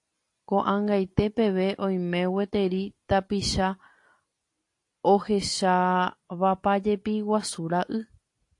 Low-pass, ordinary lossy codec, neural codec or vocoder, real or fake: 10.8 kHz; AAC, 48 kbps; none; real